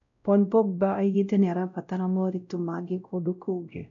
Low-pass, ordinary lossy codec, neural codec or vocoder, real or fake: 7.2 kHz; none; codec, 16 kHz, 0.5 kbps, X-Codec, WavLM features, trained on Multilingual LibriSpeech; fake